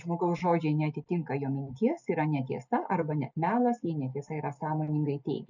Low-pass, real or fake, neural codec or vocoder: 7.2 kHz; real; none